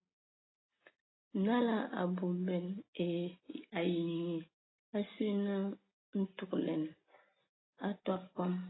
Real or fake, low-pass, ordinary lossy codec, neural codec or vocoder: real; 7.2 kHz; AAC, 16 kbps; none